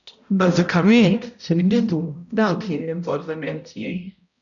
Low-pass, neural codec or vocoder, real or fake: 7.2 kHz; codec, 16 kHz, 0.5 kbps, X-Codec, HuBERT features, trained on balanced general audio; fake